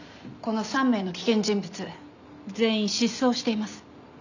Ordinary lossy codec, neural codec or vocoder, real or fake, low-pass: none; none; real; 7.2 kHz